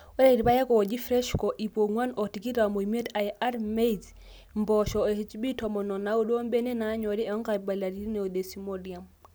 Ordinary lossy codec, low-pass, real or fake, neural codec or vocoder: none; none; real; none